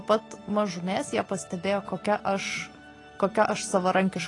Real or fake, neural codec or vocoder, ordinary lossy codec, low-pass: real; none; AAC, 32 kbps; 10.8 kHz